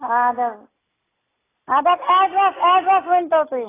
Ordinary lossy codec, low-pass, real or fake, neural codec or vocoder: AAC, 16 kbps; 3.6 kHz; real; none